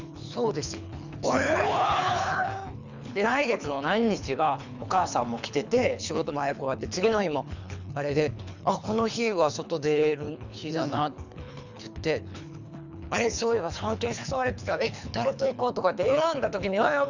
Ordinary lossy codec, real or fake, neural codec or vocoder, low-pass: none; fake; codec, 24 kHz, 3 kbps, HILCodec; 7.2 kHz